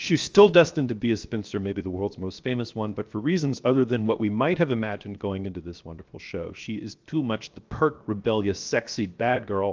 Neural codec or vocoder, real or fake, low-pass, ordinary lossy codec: codec, 16 kHz, 0.7 kbps, FocalCodec; fake; 7.2 kHz; Opus, 24 kbps